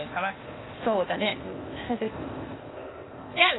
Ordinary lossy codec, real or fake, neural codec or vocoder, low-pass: AAC, 16 kbps; fake; codec, 16 kHz, 0.8 kbps, ZipCodec; 7.2 kHz